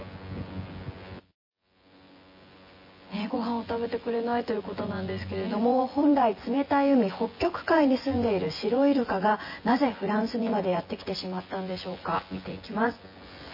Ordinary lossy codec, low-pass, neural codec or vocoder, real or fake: MP3, 24 kbps; 5.4 kHz; vocoder, 24 kHz, 100 mel bands, Vocos; fake